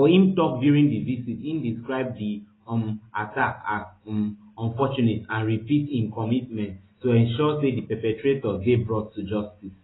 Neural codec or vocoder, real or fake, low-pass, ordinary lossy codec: none; real; 7.2 kHz; AAC, 16 kbps